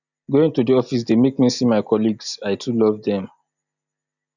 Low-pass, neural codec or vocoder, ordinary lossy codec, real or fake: 7.2 kHz; none; none; real